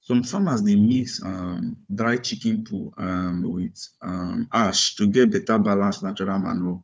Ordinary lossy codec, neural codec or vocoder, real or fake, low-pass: none; codec, 16 kHz, 4 kbps, FunCodec, trained on Chinese and English, 50 frames a second; fake; none